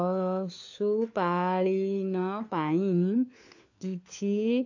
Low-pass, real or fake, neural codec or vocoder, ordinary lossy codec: 7.2 kHz; fake; codec, 16 kHz, 4 kbps, FunCodec, trained on LibriTTS, 50 frames a second; AAC, 48 kbps